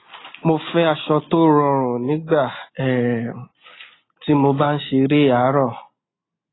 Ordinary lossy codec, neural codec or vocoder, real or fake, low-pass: AAC, 16 kbps; none; real; 7.2 kHz